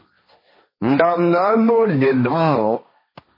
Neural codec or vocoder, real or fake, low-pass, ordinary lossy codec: codec, 16 kHz, 1.1 kbps, Voila-Tokenizer; fake; 5.4 kHz; MP3, 24 kbps